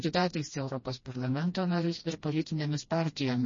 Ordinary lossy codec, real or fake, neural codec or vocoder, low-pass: MP3, 32 kbps; fake; codec, 16 kHz, 1 kbps, FreqCodec, smaller model; 7.2 kHz